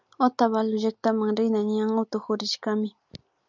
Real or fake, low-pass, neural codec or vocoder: real; 7.2 kHz; none